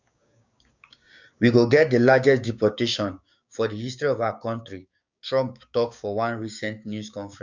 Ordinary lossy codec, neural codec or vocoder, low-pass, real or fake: Opus, 64 kbps; codec, 16 kHz, 6 kbps, DAC; 7.2 kHz; fake